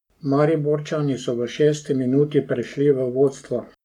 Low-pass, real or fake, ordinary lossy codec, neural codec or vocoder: 19.8 kHz; fake; none; codec, 44.1 kHz, 7.8 kbps, Pupu-Codec